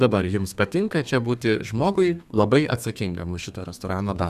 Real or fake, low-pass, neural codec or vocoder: fake; 14.4 kHz; codec, 32 kHz, 1.9 kbps, SNAC